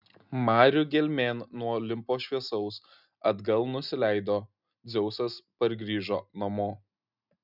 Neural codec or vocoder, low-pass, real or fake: none; 5.4 kHz; real